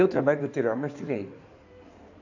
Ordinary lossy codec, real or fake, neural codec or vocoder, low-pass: none; fake; codec, 16 kHz in and 24 kHz out, 2.2 kbps, FireRedTTS-2 codec; 7.2 kHz